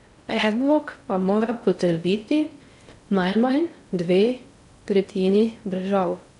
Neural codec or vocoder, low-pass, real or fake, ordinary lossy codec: codec, 16 kHz in and 24 kHz out, 0.6 kbps, FocalCodec, streaming, 4096 codes; 10.8 kHz; fake; none